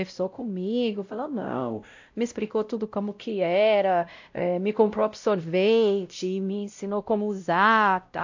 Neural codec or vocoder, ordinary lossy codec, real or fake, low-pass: codec, 16 kHz, 0.5 kbps, X-Codec, WavLM features, trained on Multilingual LibriSpeech; AAC, 48 kbps; fake; 7.2 kHz